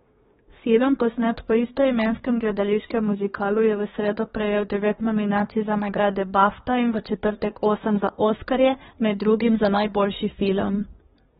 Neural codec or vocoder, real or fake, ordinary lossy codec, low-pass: codec, 24 kHz, 1 kbps, SNAC; fake; AAC, 16 kbps; 10.8 kHz